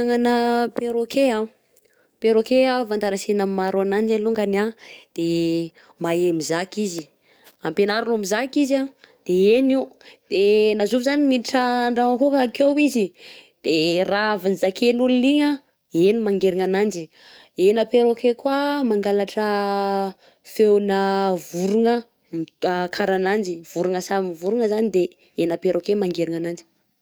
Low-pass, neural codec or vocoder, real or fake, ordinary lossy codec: none; codec, 44.1 kHz, 7.8 kbps, DAC; fake; none